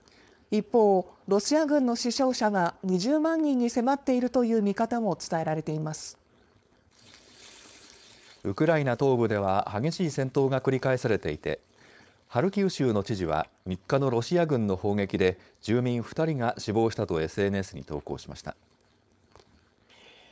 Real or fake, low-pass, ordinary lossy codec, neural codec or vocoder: fake; none; none; codec, 16 kHz, 4.8 kbps, FACodec